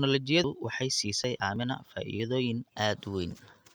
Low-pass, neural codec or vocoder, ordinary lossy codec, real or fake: none; none; none; real